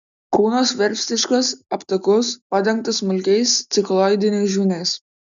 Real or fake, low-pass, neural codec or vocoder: real; 7.2 kHz; none